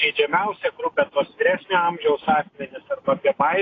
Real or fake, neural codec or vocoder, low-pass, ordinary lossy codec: real; none; 7.2 kHz; AAC, 32 kbps